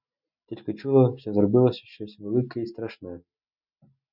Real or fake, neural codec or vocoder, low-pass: real; none; 5.4 kHz